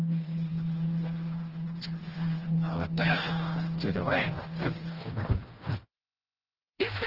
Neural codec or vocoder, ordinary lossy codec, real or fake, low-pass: codec, 16 kHz, 2 kbps, FreqCodec, smaller model; Opus, 16 kbps; fake; 5.4 kHz